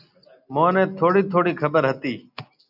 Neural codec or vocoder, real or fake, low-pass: none; real; 5.4 kHz